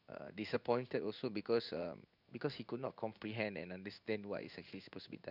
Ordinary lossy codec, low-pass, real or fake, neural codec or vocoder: none; 5.4 kHz; fake; codec, 16 kHz in and 24 kHz out, 1 kbps, XY-Tokenizer